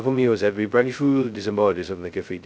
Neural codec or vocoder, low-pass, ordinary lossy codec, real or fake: codec, 16 kHz, 0.2 kbps, FocalCodec; none; none; fake